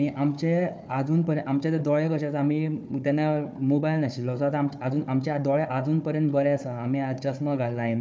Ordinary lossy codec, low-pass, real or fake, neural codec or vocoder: none; none; fake; codec, 16 kHz, 4 kbps, FunCodec, trained on Chinese and English, 50 frames a second